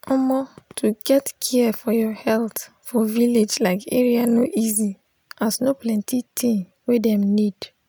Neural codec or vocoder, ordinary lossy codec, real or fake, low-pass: none; none; real; none